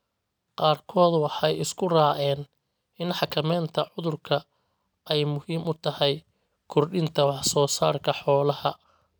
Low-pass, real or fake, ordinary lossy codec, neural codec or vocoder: none; real; none; none